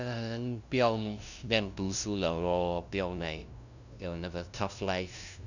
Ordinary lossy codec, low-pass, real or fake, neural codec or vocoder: none; 7.2 kHz; fake; codec, 16 kHz, 0.5 kbps, FunCodec, trained on LibriTTS, 25 frames a second